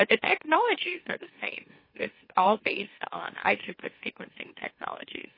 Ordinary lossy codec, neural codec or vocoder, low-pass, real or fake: MP3, 24 kbps; autoencoder, 44.1 kHz, a latent of 192 numbers a frame, MeloTTS; 5.4 kHz; fake